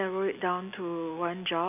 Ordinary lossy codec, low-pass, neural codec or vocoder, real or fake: none; 3.6 kHz; none; real